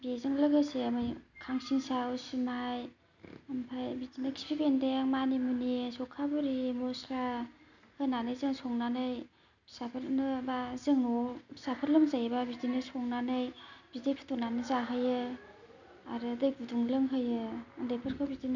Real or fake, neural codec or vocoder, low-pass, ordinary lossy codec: real; none; 7.2 kHz; none